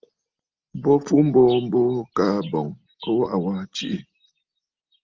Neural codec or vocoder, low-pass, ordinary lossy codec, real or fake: none; 7.2 kHz; Opus, 32 kbps; real